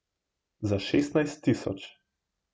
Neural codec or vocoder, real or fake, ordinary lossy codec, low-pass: none; real; none; none